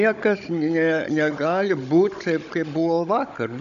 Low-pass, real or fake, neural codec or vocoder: 7.2 kHz; fake; codec, 16 kHz, 16 kbps, FunCodec, trained on Chinese and English, 50 frames a second